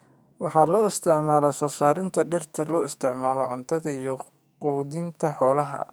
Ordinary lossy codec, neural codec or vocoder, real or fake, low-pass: none; codec, 44.1 kHz, 2.6 kbps, SNAC; fake; none